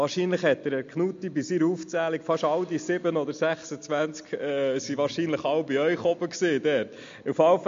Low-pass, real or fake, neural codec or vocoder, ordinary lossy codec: 7.2 kHz; real; none; MP3, 48 kbps